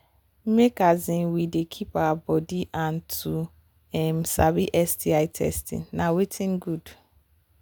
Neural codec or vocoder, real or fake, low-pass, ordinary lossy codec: none; real; none; none